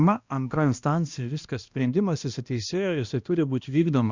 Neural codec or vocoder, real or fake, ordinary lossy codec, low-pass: codec, 16 kHz, 1 kbps, X-Codec, HuBERT features, trained on balanced general audio; fake; Opus, 64 kbps; 7.2 kHz